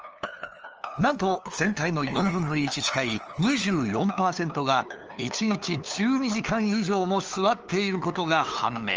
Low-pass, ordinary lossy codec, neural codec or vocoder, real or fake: 7.2 kHz; Opus, 24 kbps; codec, 16 kHz, 2 kbps, FunCodec, trained on LibriTTS, 25 frames a second; fake